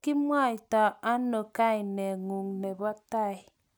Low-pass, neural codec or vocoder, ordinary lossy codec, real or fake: none; none; none; real